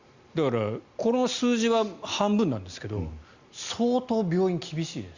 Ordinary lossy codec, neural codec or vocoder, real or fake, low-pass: Opus, 64 kbps; none; real; 7.2 kHz